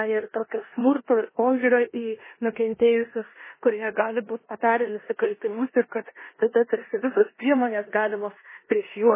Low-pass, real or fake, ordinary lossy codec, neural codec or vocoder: 3.6 kHz; fake; MP3, 16 kbps; codec, 16 kHz in and 24 kHz out, 0.9 kbps, LongCat-Audio-Codec, four codebook decoder